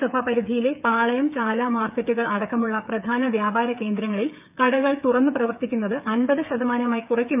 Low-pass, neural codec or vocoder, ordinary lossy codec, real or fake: 3.6 kHz; codec, 16 kHz, 8 kbps, FreqCodec, smaller model; none; fake